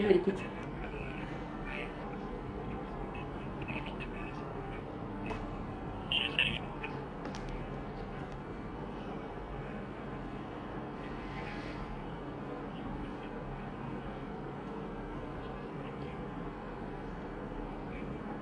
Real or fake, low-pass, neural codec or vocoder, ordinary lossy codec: fake; 9.9 kHz; codec, 44.1 kHz, 2.6 kbps, SNAC; MP3, 64 kbps